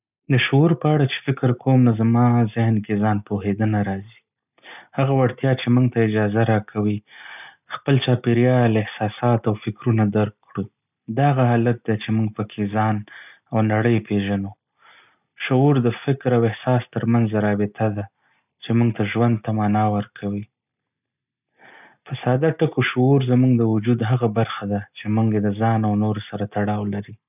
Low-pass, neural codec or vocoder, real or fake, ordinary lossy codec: 3.6 kHz; none; real; none